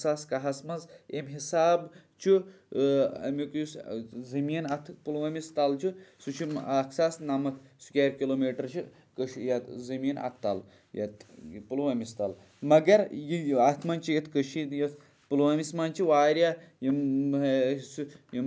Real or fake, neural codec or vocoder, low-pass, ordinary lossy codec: real; none; none; none